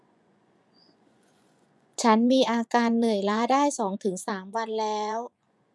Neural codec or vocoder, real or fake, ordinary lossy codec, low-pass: vocoder, 24 kHz, 100 mel bands, Vocos; fake; none; none